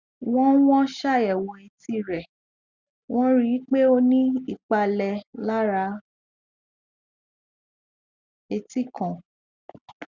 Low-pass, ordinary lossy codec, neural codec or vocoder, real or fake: 7.2 kHz; Opus, 64 kbps; none; real